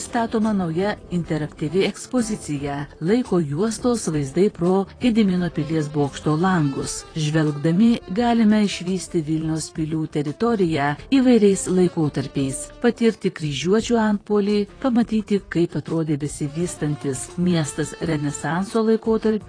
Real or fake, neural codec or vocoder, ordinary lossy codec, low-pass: fake; vocoder, 44.1 kHz, 128 mel bands, Pupu-Vocoder; AAC, 32 kbps; 9.9 kHz